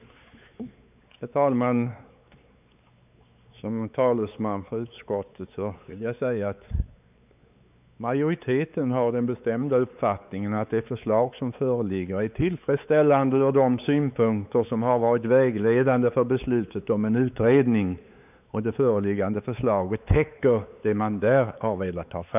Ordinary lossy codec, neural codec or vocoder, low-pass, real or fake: none; codec, 16 kHz, 4 kbps, X-Codec, WavLM features, trained on Multilingual LibriSpeech; 3.6 kHz; fake